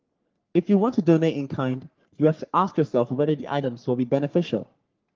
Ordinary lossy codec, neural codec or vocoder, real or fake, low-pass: Opus, 32 kbps; codec, 44.1 kHz, 3.4 kbps, Pupu-Codec; fake; 7.2 kHz